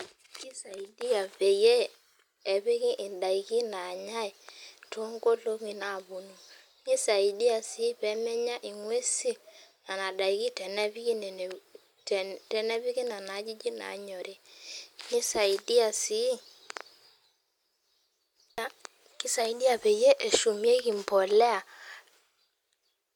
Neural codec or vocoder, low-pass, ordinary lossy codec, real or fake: none; 19.8 kHz; none; real